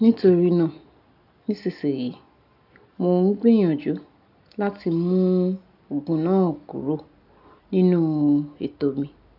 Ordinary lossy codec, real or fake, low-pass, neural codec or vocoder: none; real; 5.4 kHz; none